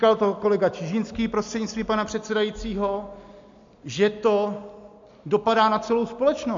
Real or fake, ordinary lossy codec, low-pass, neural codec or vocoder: real; MP3, 48 kbps; 7.2 kHz; none